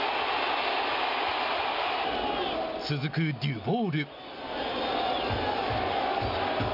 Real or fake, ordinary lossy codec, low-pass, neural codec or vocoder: real; none; 5.4 kHz; none